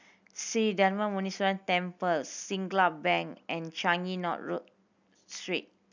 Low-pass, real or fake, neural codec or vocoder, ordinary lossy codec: 7.2 kHz; real; none; none